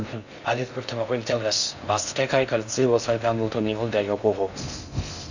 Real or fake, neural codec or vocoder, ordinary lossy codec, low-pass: fake; codec, 16 kHz in and 24 kHz out, 0.6 kbps, FocalCodec, streaming, 2048 codes; none; 7.2 kHz